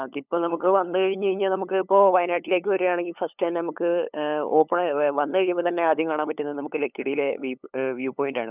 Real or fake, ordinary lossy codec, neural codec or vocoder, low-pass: fake; none; codec, 16 kHz, 8 kbps, FunCodec, trained on LibriTTS, 25 frames a second; 3.6 kHz